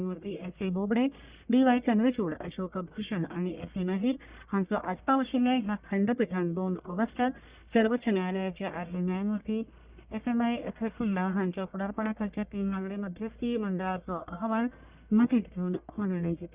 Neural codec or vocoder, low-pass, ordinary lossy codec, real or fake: codec, 44.1 kHz, 1.7 kbps, Pupu-Codec; 3.6 kHz; Opus, 64 kbps; fake